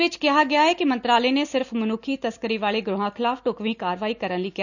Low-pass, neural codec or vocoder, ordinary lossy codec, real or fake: 7.2 kHz; none; none; real